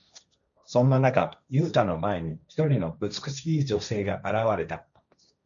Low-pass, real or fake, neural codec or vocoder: 7.2 kHz; fake; codec, 16 kHz, 1.1 kbps, Voila-Tokenizer